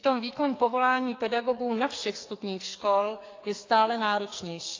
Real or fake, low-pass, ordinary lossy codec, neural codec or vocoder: fake; 7.2 kHz; AAC, 32 kbps; codec, 44.1 kHz, 2.6 kbps, SNAC